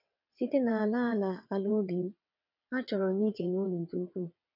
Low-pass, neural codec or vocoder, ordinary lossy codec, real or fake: 5.4 kHz; vocoder, 22.05 kHz, 80 mel bands, WaveNeXt; none; fake